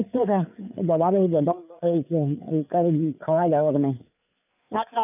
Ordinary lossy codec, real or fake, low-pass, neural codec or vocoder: none; fake; 3.6 kHz; codec, 16 kHz, 4 kbps, FreqCodec, larger model